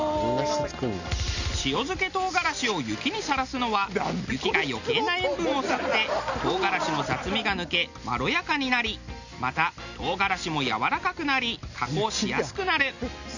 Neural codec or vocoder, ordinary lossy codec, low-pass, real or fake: none; none; 7.2 kHz; real